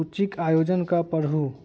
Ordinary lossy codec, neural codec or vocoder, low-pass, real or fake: none; none; none; real